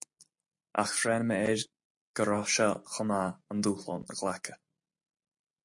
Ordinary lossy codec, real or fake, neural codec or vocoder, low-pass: MP3, 48 kbps; real; none; 10.8 kHz